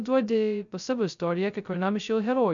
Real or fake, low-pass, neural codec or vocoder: fake; 7.2 kHz; codec, 16 kHz, 0.2 kbps, FocalCodec